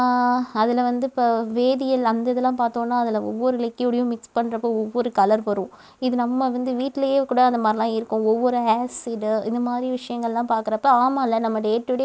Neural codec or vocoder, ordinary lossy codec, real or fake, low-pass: none; none; real; none